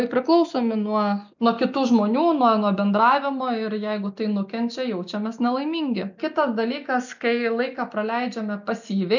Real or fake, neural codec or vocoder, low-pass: real; none; 7.2 kHz